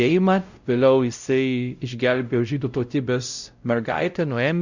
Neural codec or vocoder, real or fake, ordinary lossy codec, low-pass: codec, 16 kHz, 0.5 kbps, X-Codec, WavLM features, trained on Multilingual LibriSpeech; fake; Opus, 64 kbps; 7.2 kHz